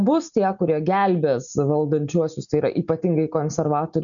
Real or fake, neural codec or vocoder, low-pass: real; none; 7.2 kHz